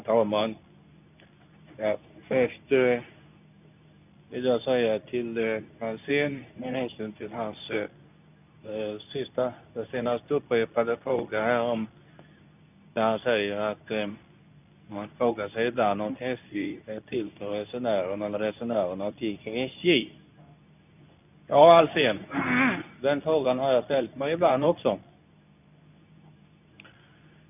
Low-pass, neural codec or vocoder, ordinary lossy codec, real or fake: 3.6 kHz; codec, 24 kHz, 0.9 kbps, WavTokenizer, medium speech release version 2; none; fake